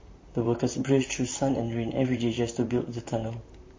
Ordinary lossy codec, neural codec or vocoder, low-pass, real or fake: MP3, 32 kbps; vocoder, 44.1 kHz, 128 mel bands, Pupu-Vocoder; 7.2 kHz; fake